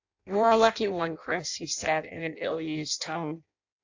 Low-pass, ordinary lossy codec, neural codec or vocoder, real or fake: 7.2 kHz; AAC, 48 kbps; codec, 16 kHz in and 24 kHz out, 0.6 kbps, FireRedTTS-2 codec; fake